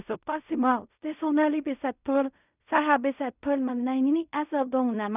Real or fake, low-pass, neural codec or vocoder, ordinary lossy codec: fake; 3.6 kHz; codec, 16 kHz in and 24 kHz out, 0.4 kbps, LongCat-Audio-Codec, fine tuned four codebook decoder; none